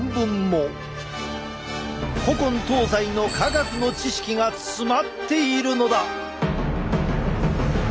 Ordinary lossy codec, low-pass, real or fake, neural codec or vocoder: none; none; real; none